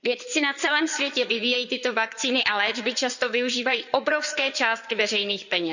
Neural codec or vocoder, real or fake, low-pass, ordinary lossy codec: codec, 16 kHz in and 24 kHz out, 2.2 kbps, FireRedTTS-2 codec; fake; 7.2 kHz; none